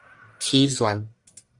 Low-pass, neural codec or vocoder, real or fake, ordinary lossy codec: 10.8 kHz; codec, 44.1 kHz, 1.7 kbps, Pupu-Codec; fake; Opus, 64 kbps